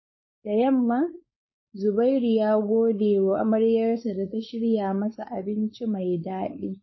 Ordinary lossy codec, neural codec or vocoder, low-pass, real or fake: MP3, 24 kbps; codec, 16 kHz, 4.8 kbps, FACodec; 7.2 kHz; fake